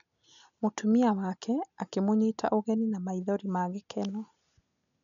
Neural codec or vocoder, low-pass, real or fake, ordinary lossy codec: none; 7.2 kHz; real; none